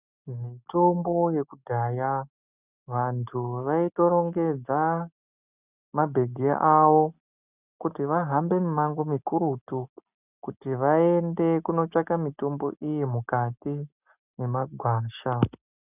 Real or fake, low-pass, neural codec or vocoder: real; 3.6 kHz; none